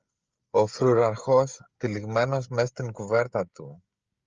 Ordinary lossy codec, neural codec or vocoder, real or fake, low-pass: Opus, 24 kbps; codec, 16 kHz, 16 kbps, FreqCodec, smaller model; fake; 7.2 kHz